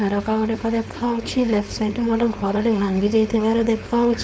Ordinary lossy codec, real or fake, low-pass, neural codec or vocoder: none; fake; none; codec, 16 kHz, 4.8 kbps, FACodec